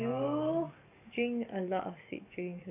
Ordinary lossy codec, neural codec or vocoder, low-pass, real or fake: none; codec, 44.1 kHz, 7.8 kbps, DAC; 3.6 kHz; fake